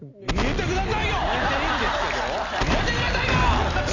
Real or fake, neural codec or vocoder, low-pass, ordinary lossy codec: real; none; 7.2 kHz; none